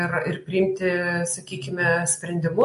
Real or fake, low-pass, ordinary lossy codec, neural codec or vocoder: real; 14.4 kHz; MP3, 48 kbps; none